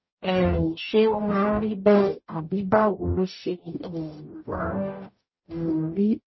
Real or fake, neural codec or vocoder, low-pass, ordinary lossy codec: fake; codec, 44.1 kHz, 0.9 kbps, DAC; 7.2 kHz; MP3, 24 kbps